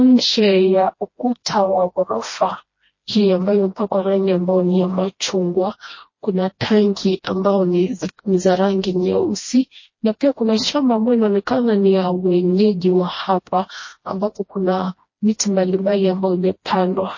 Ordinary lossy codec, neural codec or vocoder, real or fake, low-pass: MP3, 32 kbps; codec, 16 kHz, 1 kbps, FreqCodec, smaller model; fake; 7.2 kHz